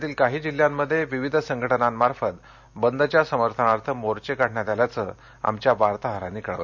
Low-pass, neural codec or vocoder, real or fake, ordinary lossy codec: 7.2 kHz; none; real; none